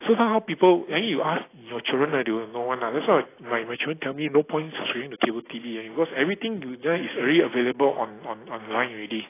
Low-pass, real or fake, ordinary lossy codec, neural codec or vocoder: 3.6 kHz; real; AAC, 16 kbps; none